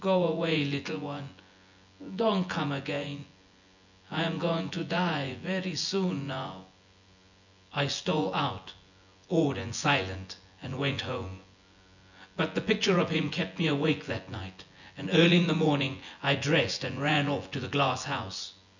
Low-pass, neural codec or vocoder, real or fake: 7.2 kHz; vocoder, 24 kHz, 100 mel bands, Vocos; fake